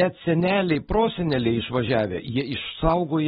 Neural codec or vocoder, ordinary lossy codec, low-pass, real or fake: none; AAC, 16 kbps; 10.8 kHz; real